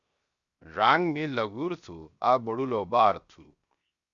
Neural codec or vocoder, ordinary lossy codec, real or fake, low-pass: codec, 16 kHz, 0.7 kbps, FocalCodec; Opus, 64 kbps; fake; 7.2 kHz